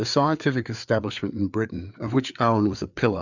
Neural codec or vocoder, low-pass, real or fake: codec, 16 kHz, 4 kbps, FreqCodec, larger model; 7.2 kHz; fake